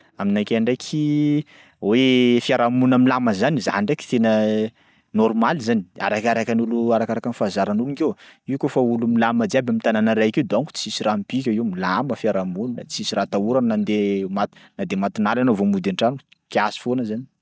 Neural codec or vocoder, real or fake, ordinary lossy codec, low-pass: none; real; none; none